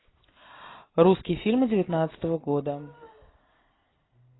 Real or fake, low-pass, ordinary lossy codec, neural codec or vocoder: real; 7.2 kHz; AAC, 16 kbps; none